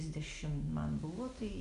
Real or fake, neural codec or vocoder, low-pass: real; none; 10.8 kHz